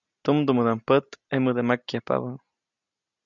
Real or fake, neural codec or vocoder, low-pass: real; none; 7.2 kHz